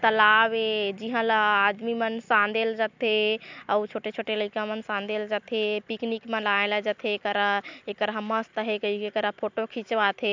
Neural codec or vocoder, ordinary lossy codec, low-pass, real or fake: none; MP3, 64 kbps; 7.2 kHz; real